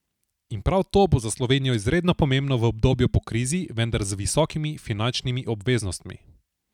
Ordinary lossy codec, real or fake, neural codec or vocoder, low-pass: none; real; none; 19.8 kHz